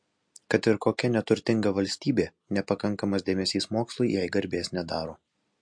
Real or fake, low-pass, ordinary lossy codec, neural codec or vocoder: real; 9.9 kHz; MP3, 48 kbps; none